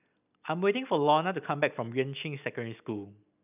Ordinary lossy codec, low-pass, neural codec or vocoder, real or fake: none; 3.6 kHz; none; real